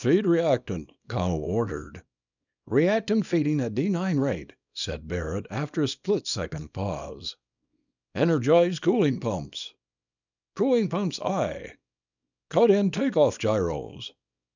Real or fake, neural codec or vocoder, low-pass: fake; codec, 24 kHz, 0.9 kbps, WavTokenizer, small release; 7.2 kHz